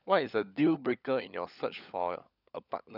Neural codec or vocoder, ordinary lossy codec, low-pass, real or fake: codec, 16 kHz, 16 kbps, FunCodec, trained on LibriTTS, 50 frames a second; none; 5.4 kHz; fake